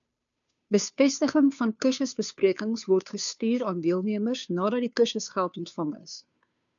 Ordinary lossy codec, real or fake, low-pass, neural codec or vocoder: MP3, 96 kbps; fake; 7.2 kHz; codec, 16 kHz, 2 kbps, FunCodec, trained on Chinese and English, 25 frames a second